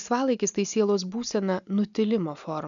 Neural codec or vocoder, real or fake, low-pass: none; real; 7.2 kHz